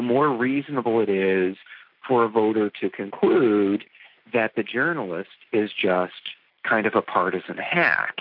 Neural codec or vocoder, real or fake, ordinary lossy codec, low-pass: none; real; MP3, 48 kbps; 5.4 kHz